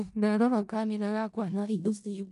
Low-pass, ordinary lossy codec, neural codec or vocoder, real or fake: 10.8 kHz; MP3, 96 kbps; codec, 16 kHz in and 24 kHz out, 0.4 kbps, LongCat-Audio-Codec, four codebook decoder; fake